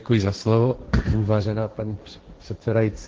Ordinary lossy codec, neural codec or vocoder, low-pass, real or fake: Opus, 16 kbps; codec, 16 kHz, 1.1 kbps, Voila-Tokenizer; 7.2 kHz; fake